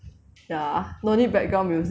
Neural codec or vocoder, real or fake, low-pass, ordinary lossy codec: none; real; none; none